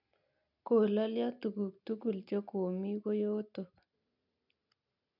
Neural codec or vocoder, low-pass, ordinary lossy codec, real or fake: none; 5.4 kHz; none; real